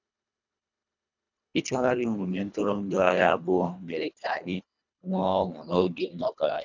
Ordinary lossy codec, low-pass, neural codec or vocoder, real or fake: none; 7.2 kHz; codec, 24 kHz, 1.5 kbps, HILCodec; fake